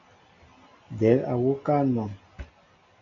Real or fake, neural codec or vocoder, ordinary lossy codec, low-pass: real; none; AAC, 64 kbps; 7.2 kHz